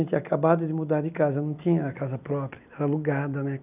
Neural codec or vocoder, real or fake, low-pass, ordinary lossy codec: none; real; 3.6 kHz; none